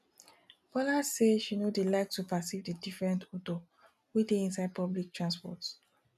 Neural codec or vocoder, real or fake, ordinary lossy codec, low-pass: none; real; none; 14.4 kHz